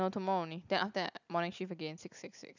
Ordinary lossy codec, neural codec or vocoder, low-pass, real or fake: none; none; 7.2 kHz; real